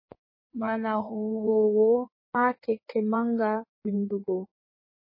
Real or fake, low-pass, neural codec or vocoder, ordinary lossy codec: fake; 5.4 kHz; codec, 16 kHz in and 24 kHz out, 1.1 kbps, FireRedTTS-2 codec; MP3, 24 kbps